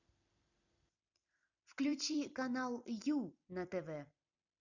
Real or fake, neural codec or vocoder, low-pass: fake; vocoder, 44.1 kHz, 128 mel bands every 256 samples, BigVGAN v2; 7.2 kHz